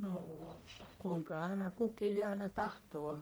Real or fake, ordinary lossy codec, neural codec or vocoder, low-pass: fake; none; codec, 44.1 kHz, 1.7 kbps, Pupu-Codec; none